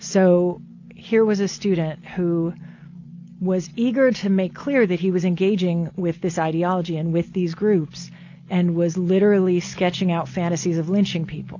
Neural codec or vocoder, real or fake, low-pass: none; real; 7.2 kHz